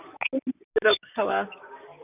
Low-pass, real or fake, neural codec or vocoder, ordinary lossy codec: 3.6 kHz; fake; vocoder, 44.1 kHz, 128 mel bands, Pupu-Vocoder; none